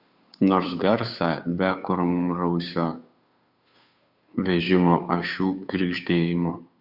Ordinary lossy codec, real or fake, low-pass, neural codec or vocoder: Opus, 64 kbps; fake; 5.4 kHz; codec, 16 kHz, 2 kbps, FunCodec, trained on Chinese and English, 25 frames a second